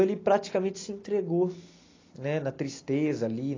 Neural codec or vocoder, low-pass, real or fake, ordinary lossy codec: none; 7.2 kHz; real; AAC, 32 kbps